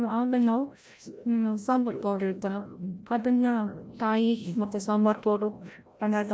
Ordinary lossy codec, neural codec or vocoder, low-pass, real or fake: none; codec, 16 kHz, 0.5 kbps, FreqCodec, larger model; none; fake